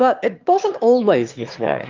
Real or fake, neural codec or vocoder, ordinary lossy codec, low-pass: fake; autoencoder, 22.05 kHz, a latent of 192 numbers a frame, VITS, trained on one speaker; Opus, 32 kbps; 7.2 kHz